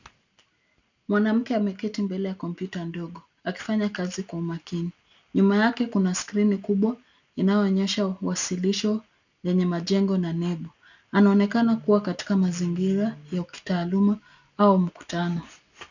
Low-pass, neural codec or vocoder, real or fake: 7.2 kHz; none; real